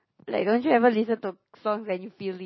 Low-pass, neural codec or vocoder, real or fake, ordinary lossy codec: 7.2 kHz; none; real; MP3, 24 kbps